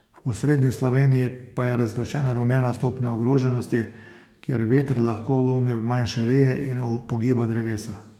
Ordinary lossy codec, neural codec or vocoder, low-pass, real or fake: none; codec, 44.1 kHz, 2.6 kbps, DAC; 19.8 kHz; fake